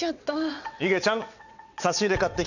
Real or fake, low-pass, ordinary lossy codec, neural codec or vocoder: fake; 7.2 kHz; none; vocoder, 22.05 kHz, 80 mel bands, WaveNeXt